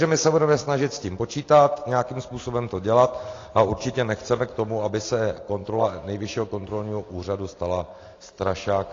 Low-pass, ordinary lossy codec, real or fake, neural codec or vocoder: 7.2 kHz; AAC, 32 kbps; real; none